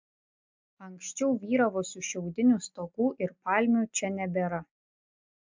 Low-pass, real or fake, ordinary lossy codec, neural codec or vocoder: 7.2 kHz; real; MP3, 64 kbps; none